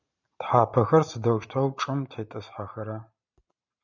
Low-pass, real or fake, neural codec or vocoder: 7.2 kHz; fake; vocoder, 44.1 kHz, 128 mel bands every 512 samples, BigVGAN v2